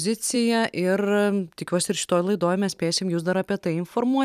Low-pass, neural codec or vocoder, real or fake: 14.4 kHz; vocoder, 44.1 kHz, 128 mel bands every 256 samples, BigVGAN v2; fake